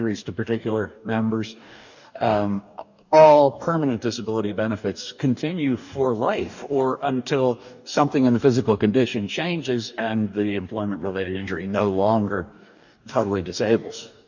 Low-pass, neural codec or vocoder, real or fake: 7.2 kHz; codec, 44.1 kHz, 2.6 kbps, DAC; fake